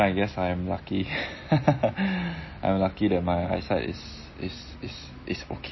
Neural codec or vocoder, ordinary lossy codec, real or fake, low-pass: none; MP3, 24 kbps; real; 7.2 kHz